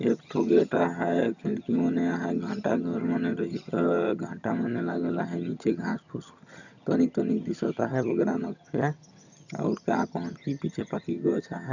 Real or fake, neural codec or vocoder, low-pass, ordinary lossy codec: fake; vocoder, 22.05 kHz, 80 mel bands, HiFi-GAN; 7.2 kHz; none